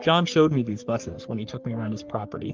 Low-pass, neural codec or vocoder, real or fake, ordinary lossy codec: 7.2 kHz; codec, 44.1 kHz, 3.4 kbps, Pupu-Codec; fake; Opus, 24 kbps